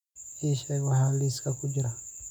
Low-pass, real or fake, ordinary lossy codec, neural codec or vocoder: 19.8 kHz; real; none; none